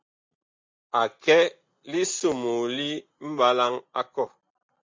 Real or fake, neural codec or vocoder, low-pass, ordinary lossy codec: real; none; 7.2 kHz; MP3, 48 kbps